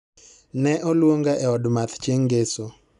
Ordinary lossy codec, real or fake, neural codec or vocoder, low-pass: none; real; none; 10.8 kHz